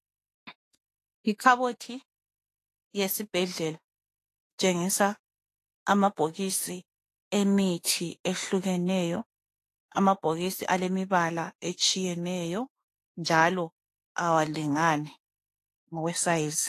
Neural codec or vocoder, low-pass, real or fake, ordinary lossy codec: autoencoder, 48 kHz, 32 numbers a frame, DAC-VAE, trained on Japanese speech; 14.4 kHz; fake; AAC, 48 kbps